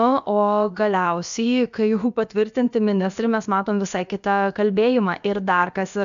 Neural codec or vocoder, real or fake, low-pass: codec, 16 kHz, about 1 kbps, DyCAST, with the encoder's durations; fake; 7.2 kHz